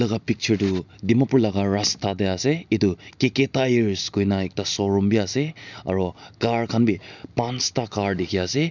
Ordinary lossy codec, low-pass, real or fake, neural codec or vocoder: none; 7.2 kHz; real; none